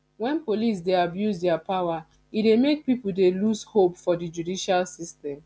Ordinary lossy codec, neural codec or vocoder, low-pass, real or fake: none; none; none; real